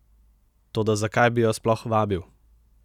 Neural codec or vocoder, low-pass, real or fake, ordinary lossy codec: none; 19.8 kHz; real; none